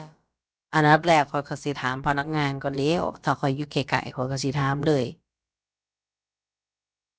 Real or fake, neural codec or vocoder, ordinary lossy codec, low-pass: fake; codec, 16 kHz, about 1 kbps, DyCAST, with the encoder's durations; none; none